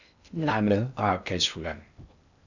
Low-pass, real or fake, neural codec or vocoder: 7.2 kHz; fake; codec, 16 kHz in and 24 kHz out, 0.6 kbps, FocalCodec, streaming, 2048 codes